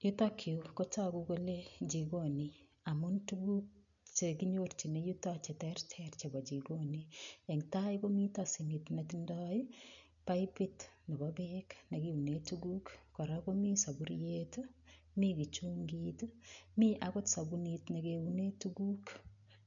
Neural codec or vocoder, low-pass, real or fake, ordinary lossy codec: none; 7.2 kHz; real; none